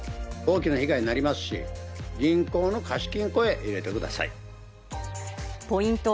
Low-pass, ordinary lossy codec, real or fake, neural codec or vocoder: none; none; real; none